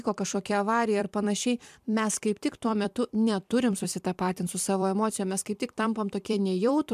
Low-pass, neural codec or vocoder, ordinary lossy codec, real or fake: 14.4 kHz; vocoder, 44.1 kHz, 128 mel bands every 256 samples, BigVGAN v2; MP3, 96 kbps; fake